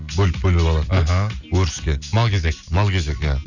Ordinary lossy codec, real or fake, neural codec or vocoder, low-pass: none; real; none; 7.2 kHz